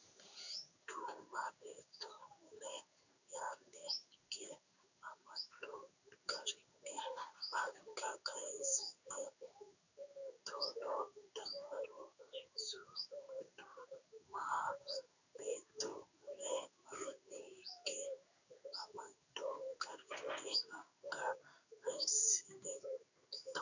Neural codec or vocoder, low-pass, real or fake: codec, 16 kHz in and 24 kHz out, 1 kbps, XY-Tokenizer; 7.2 kHz; fake